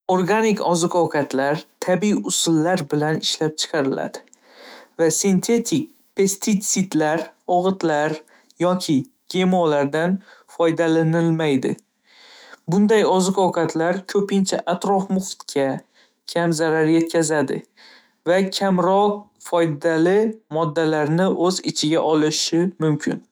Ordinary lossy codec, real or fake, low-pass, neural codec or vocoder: none; fake; none; autoencoder, 48 kHz, 128 numbers a frame, DAC-VAE, trained on Japanese speech